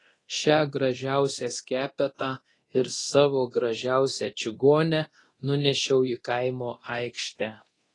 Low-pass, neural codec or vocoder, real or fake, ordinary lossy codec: 10.8 kHz; codec, 24 kHz, 0.9 kbps, DualCodec; fake; AAC, 32 kbps